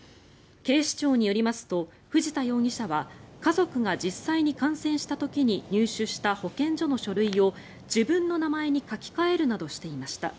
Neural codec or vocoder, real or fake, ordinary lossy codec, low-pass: none; real; none; none